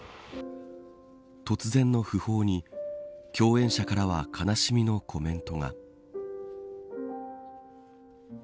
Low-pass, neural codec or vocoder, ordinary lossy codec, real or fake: none; none; none; real